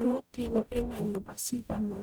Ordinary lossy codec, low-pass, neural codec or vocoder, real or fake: none; none; codec, 44.1 kHz, 0.9 kbps, DAC; fake